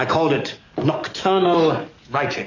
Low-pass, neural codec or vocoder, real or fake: 7.2 kHz; codec, 44.1 kHz, 7.8 kbps, DAC; fake